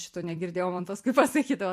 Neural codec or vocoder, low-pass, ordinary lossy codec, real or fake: vocoder, 44.1 kHz, 128 mel bands every 256 samples, BigVGAN v2; 14.4 kHz; MP3, 64 kbps; fake